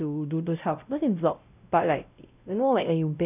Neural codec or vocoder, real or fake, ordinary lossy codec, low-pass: codec, 16 kHz, 0.5 kbps, X-Codec, WavLM features, trained on Multilingual LibriSpeech; fake; none; 3.6 kHz